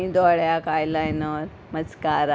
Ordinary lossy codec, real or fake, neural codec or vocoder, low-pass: none; real; none; none